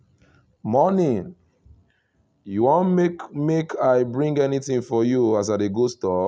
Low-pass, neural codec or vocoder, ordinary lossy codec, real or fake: none; none; none; real